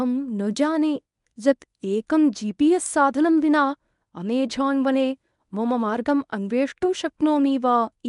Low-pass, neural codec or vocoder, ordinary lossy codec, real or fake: 10.8 kHz; codec, 24 kHz, 0.9 kbps, WavTokenizer, medium speech release version 1; none; fake